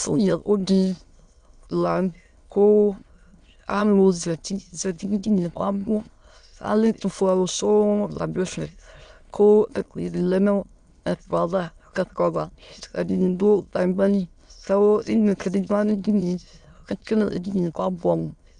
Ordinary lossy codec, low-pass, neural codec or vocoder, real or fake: Opus, 64 kbps; 9.9 kHz; autoencoder, 22.05 kHz, a latent of 192 numbers a frame, VITS, trained on many speakers; fake